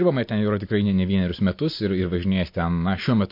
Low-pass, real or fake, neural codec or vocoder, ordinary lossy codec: 5.4 kHz; real; none; MP3, 32 kbps